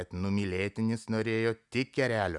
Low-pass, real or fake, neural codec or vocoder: 10.8 kHz; real; none